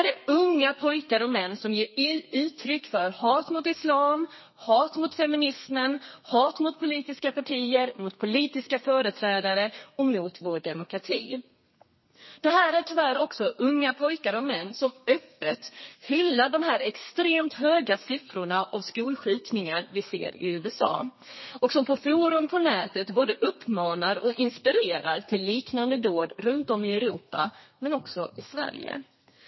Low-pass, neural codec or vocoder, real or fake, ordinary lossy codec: 7.2 kHz; codec, 32 kHz, 1.9 kbps, SNAC; fake; MP3, 24 kbps